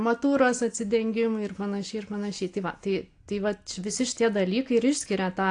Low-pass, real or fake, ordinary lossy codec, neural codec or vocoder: 9.9 kHz; real; AAC, 48 kbps; none